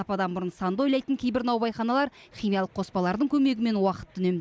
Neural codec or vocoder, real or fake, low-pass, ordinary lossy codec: none; real; none; none